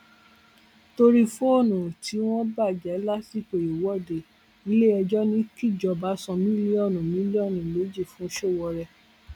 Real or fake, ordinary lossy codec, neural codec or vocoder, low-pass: real; none; none; 19.8 kHz